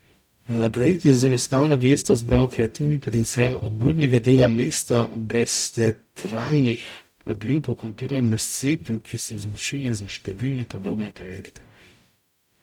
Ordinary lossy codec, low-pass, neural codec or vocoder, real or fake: none; 19.8 kHz; codec, 44.1 kHz, 0.9 kbps, DAC; fake